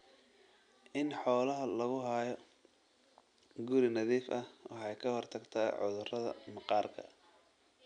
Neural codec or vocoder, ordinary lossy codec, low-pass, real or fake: none; none; 9.9 kHz; real